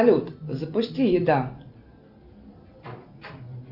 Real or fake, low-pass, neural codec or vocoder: real; 5.4 kHz; none